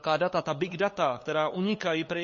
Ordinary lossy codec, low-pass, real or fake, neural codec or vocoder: MP3, 32 kbps; 7.2 kHz; fake; codec, 16 kHz, 8 kbps, FunCodec, trained on LibriTTS, 25 frames a second